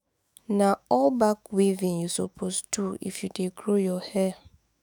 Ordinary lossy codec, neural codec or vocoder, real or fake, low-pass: none; autoencoder, 48 kHz, 128 numbers a frame, DAC-VAE, trained on Japanese speech; fake; none